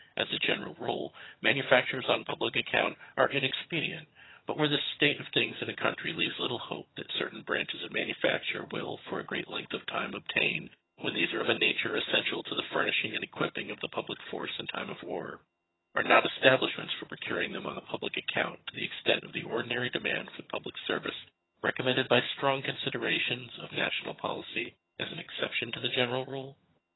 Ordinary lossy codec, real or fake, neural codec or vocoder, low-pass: AAC, 16 kbps; fake; vocoder, 22.05 kHz, 80 mel bands, HiFi-GAN; 7.2 kHz